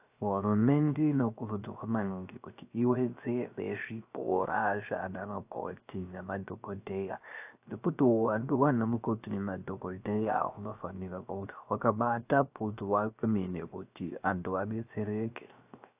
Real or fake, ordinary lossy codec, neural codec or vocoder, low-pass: fake; AAC, 32 kbps; codec, 16 kHz, 0.3 kbps, FocalCodec; 3.6 kHz